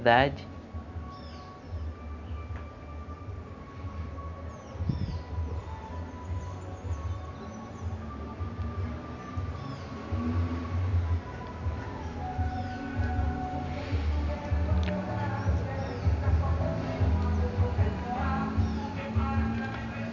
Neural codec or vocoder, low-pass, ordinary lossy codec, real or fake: none; 7.2 kHz; none; real